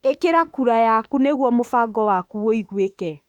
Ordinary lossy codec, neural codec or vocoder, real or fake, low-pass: Opus, 64 kbps; autoencoder, 48 kHz, 32 numbers a frame, DAC-VAE, trained on Japanese speech; fake; 19.8 kHz